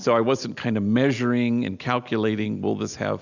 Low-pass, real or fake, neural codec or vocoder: 7.2 kHz; real; none